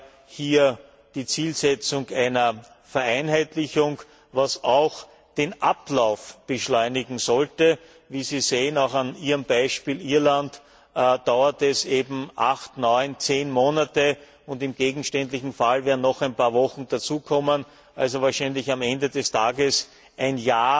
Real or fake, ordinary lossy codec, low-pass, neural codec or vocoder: real; none; none; none